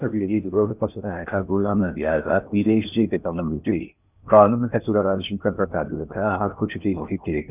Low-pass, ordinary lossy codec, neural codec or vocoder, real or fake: 3.6 kHz; none; codec, 16 kHz in and 24 kHz out, 0.6 kbps, FocalCodec, streaming, 4096 codes; fake